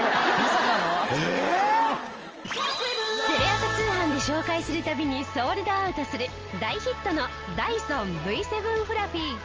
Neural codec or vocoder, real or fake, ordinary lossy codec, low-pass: none; real; Opus, 24 kbps; 7.2 kHz